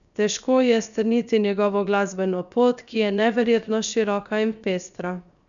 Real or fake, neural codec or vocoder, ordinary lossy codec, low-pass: fake; codec, 16 kHz, 0.7 kbps, FocalCodec; none; 7.2 kHz